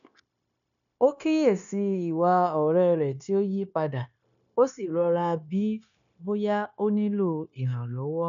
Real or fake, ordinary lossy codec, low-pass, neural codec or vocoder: fake; none; 7.2 kHz; codec, 16 kHz, 0.9 kbps, LongCat-Audio-Codec